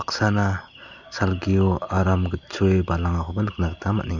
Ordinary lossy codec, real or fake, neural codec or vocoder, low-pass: Opus, 64 kbps; real; none; 7.2 kHz